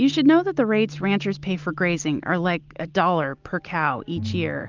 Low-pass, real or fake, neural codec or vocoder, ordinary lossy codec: 7.2 kHz; real; none; Opus, 24 kbps